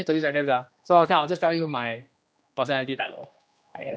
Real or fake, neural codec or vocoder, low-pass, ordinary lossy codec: fake; codec, 16 kHz, 1 kbps, X-Codec, HuBERT features, trained on general audio; none; none